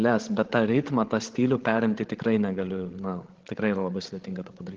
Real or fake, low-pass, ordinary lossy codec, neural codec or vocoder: fake; 7.2 kHz; Opus, 16 kbps; codec, 16 kHz, 16 kbps, FreqCodec, larger model